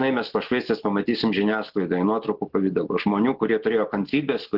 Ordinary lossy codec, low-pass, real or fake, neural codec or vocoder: Opus, 16 kbps; 5.4 kHz; real; none